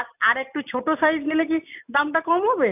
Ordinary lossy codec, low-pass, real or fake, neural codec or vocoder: none; 3.6 kHz; real; none